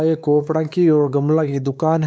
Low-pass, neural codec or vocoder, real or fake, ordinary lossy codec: none; codec, 16 kHz, 4 kbps, X-Codec, WavLM features, trained on Multilingual LibriSpeech; fake; none